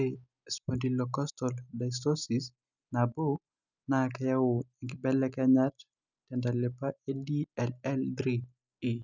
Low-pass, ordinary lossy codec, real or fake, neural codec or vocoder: 7.2 kHz; none; real; none